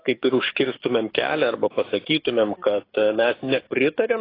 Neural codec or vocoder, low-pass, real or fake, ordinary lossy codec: codec, 44.1 kHz, 7.8 kbps, DAC; 5.4 kHz; fake; AAC, 24 kbps